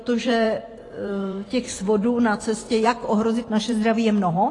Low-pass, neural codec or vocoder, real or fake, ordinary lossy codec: 10.8 kHz; vocoder, 44.1 kHz, 128 mel bands every 512 samples, BigVGAN v2; fake; AAC, 32 kbps